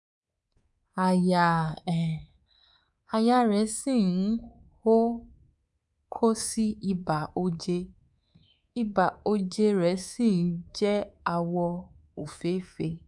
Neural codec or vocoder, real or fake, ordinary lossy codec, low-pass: codec, 24 kHz, 3.1 kbps, DualCodec; fake; none; 10.8 kHz